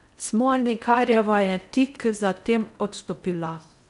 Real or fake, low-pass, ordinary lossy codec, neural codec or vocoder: fake; 10.8 kHz; none; codec, 16 kHz in and 24 kHz out, 0.6 kbps, FocalCodec, streaming, 4096 codes